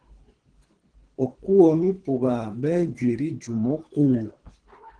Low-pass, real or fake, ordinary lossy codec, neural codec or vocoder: 9.9 kHz; fake; Opus, 16 kbps; codec, 24 kHz, 3 kbps, HILCodec